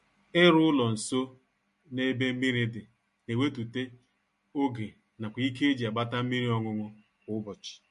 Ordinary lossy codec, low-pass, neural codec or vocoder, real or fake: MP3, 48 kbps; 10.8 kHz; none; real